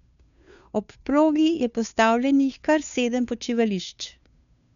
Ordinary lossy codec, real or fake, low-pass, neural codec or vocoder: none; fake; 7.2 kHz; codec, 16 kHz, 2 kbps, FunCodec, trained on Chinese and English, 25 frames a second